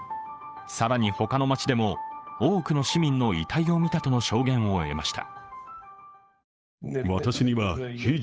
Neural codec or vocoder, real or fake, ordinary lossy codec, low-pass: codec, 16 kHz, 8 kbps, FunCodec, trained on Chinese and English, 25 frames a second; fake; none; none